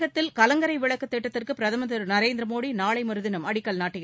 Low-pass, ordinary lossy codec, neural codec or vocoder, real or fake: none; none; none; real